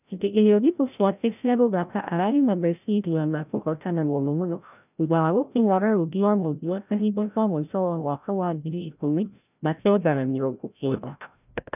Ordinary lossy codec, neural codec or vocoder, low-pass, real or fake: none; codec, 16 kHz, 0.5 kbps, FreqCodec, larger model; 3.6 kHz; fake